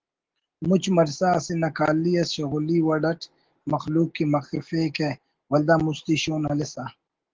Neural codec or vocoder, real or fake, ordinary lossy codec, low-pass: none; real; Opus, 16 kbps; 7.2 kHz